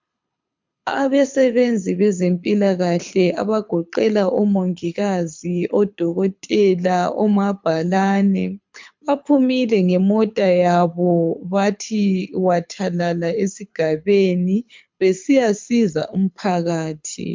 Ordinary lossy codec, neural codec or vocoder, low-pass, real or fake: MP3, 64 kbps; codec, 24 kHz, 6 kbps, HILCodec; 7.2 kHz; fake